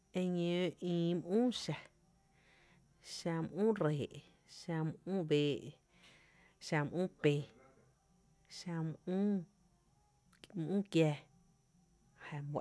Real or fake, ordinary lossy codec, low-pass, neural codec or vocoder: real; none; none; none